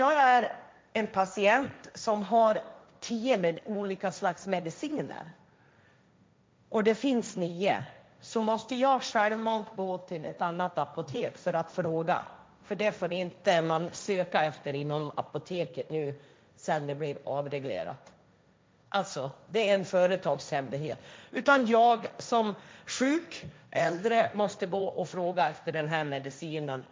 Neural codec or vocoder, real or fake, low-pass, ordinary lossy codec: codec, 16 kHz, 1.1 kbps, Voila-Tokenizer; fake; none; none